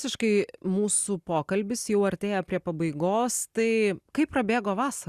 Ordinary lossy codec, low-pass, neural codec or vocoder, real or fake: Opus, 64 kbps; 14.4 kHz; none; real